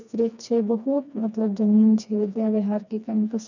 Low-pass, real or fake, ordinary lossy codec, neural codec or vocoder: 7.2 kHz; fake; none; codec, 16 kHz, 2 kbps, FreqCodec, smaller model